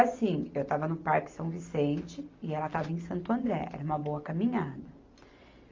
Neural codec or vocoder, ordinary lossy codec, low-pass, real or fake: none; Opus, 24 kbps; 7.2 kHz; real